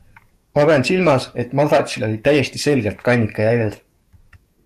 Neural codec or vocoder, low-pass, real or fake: codec, 44.1 kHz, 7.8 kbps, DAC; 14.4 kHz; fake